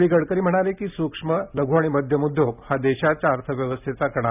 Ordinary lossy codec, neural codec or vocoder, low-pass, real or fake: none; none; 3.6 kHz; real